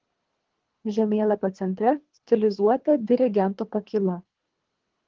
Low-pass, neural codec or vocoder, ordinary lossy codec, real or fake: 7.2 kHz; codec, 24 kHz, 3 kbps, HILCodec; Opus, 16 kbps; fake